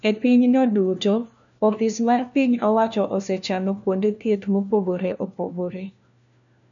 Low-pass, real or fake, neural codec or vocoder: 7.2 kHz; fake; codec, 16 kHz, 1 kbps, FunCodec, trained on LibriTTS, 50 frames a second